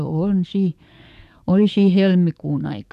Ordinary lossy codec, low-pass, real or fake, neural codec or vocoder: none; 14.4 kHz; fake; codec, 44.1 kHz, 7.8 kbps, DAC